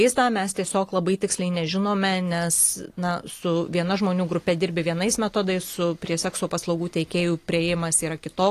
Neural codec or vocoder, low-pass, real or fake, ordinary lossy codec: none; 14.4 kHz; real; AAC, 48 kbps